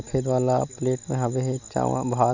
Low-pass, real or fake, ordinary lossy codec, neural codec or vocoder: 7.2 kHz; real; none; none